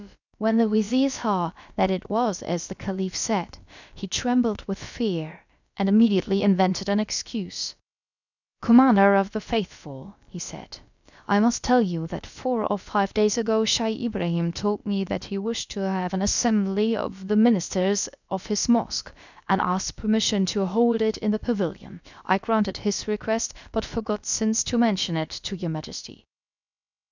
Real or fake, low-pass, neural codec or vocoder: fake; 7.2 kHz; codec, 16 kHz, about 1 kbps, DyCAST, with the encoder's durations